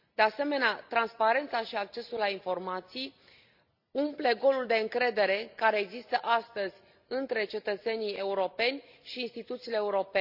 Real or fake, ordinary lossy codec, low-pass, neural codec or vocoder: real; Opus, 64 kbps; 5.4 kHz; none